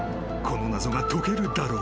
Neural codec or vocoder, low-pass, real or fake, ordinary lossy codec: none; none; real; none